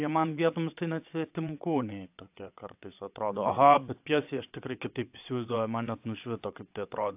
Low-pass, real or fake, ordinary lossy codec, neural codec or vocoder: 3.6 kHz; fake; AAC, 32 kbps; vocoder, 22.05 kHz, 80 mel bands, WaveNeXt